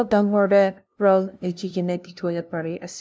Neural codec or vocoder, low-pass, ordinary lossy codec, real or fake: codec, 16 kHz, 0.5 kbps, FunCodec, trained on LibriTTS, 25 frames a second; none; none; fake